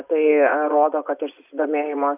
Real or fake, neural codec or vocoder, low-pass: fake; vocoder, 24 kHz, 100 mel bands, Vocos; 3.6 kHz